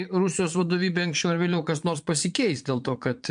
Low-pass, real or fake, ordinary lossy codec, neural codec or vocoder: 9.9 kHz; fake; MP3, 64 kbps; vocoder, 22.05 kHz, 80 mel bands, Vocos